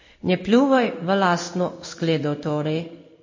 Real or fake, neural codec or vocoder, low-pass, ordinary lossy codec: fake; codec, 16 kHz in and 24 kHz out, 1 kbps, XY-Tokenizer; 7.2 kHz; MP3, 32 kbps